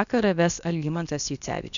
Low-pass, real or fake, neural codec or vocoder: 7.2 kHz; fake; codec, 16 kHz, about 1 kbps, DyCAST, with the encoder's durations